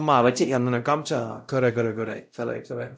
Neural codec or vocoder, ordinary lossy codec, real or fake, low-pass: codec, 16 kHz, 0.5 kbps, X-Codec, WavLM features, trained on Multilingual LibriSpeech; none; fake; none